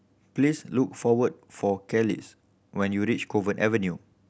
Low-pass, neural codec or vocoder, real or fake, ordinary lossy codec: none; none; real; none